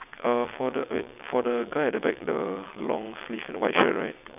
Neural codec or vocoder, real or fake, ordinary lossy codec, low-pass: vocoder, 22.05 kHz, 80 mel bands, WaveNeXt; fake; none; 3.6 kHz